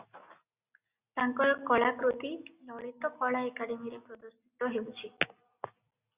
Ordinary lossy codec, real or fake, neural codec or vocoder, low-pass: Opus, 64 kbps; real; none; 3.6 kHz